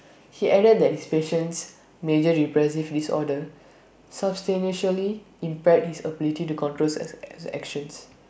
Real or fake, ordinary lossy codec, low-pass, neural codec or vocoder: real; none; none; none